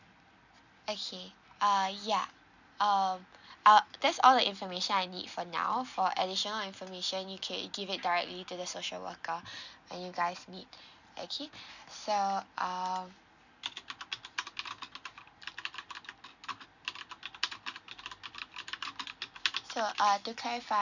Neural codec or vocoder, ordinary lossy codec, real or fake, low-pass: none; none; real; 7.2 kHz